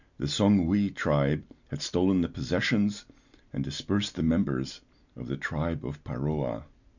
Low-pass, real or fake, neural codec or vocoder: 7.2 kHz; real; none